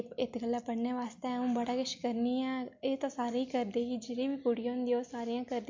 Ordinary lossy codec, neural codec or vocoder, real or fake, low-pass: MP3, 48 kbps; none; real; 7.2 kHz